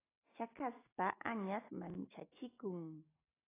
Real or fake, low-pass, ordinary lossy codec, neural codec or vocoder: real; 3.6 kHz; AAC, 16 kbps; none